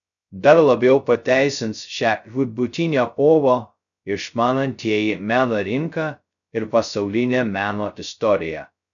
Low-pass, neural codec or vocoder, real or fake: 7.2 kHz; codec, 16 kHz, 0.2 kbps, FocalCodec; fake